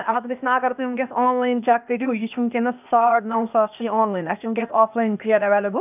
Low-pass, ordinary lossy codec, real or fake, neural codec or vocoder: 3.6 kHz; none; fake; codec, 16 kHz, 0.8 kbps, ZipCodec